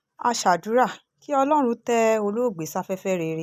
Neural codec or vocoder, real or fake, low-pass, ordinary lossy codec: none; real; 14.4 kHz; none